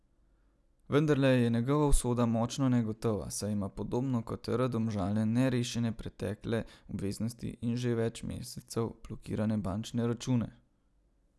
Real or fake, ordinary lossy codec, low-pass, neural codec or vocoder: real; none; none; none